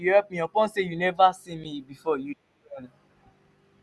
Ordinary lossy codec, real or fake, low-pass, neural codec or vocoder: none; real; none; none